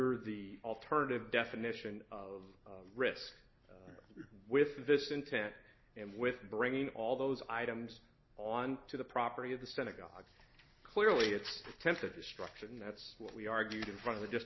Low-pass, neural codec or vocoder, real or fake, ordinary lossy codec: 7.2 kHz; none; real; MP3, 24 kbps